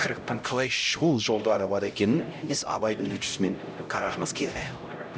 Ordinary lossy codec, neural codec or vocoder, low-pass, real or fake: none; codec, 16 kHz, 0.5 kbps, X-Codec, HuBERT features, trained on LibriSpeech; none; fake